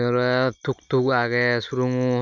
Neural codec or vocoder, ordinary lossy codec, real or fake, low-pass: none; none; real; 7.2 kHz